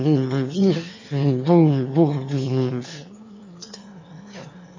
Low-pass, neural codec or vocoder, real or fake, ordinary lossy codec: 7.2 kHz; autoencoder, 22.05 kHz, a latent of 192 numbers a frame, VITS, trained on one speaker; fake; MP3, 32 kbps